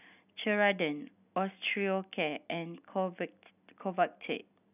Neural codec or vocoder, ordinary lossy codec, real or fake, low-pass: none; none; real; 3.6 kHz